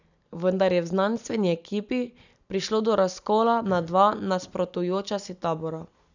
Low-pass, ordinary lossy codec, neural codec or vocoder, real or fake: 7.2 kHz; none; none; real